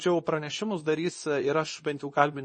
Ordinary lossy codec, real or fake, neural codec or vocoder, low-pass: MP3, 32 kbps; fake; codec, 24 kHz, 0.9 kbps, WavTokenizer, medium speech release version 2; 10.8 kHz